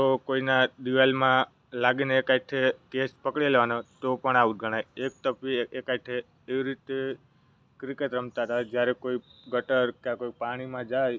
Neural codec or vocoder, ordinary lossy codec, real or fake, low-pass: none; none; real; none